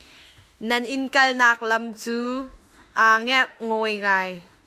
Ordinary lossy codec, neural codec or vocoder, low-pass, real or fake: Opus, 64 kbps; autoencoder, 48 kHz, 32 numbers a frame, DAC-VAE, trained on Japanese speech; 14.4 kHz; fake